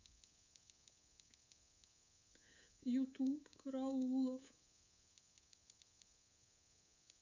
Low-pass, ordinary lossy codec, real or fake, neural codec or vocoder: 7.2 kHz; none; fake; codec, 24 kHz, 3.1 kbps, DualCodec